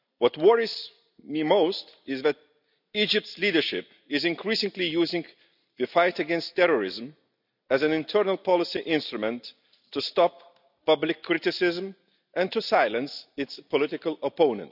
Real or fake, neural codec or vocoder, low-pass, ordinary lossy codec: real; none; 5.4 kHz; none